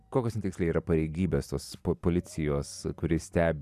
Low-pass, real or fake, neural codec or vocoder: 14.4 kHz; fake; vocoder, 44.1 kHz, 128 mel bands every 256 samples, BigVGAN v2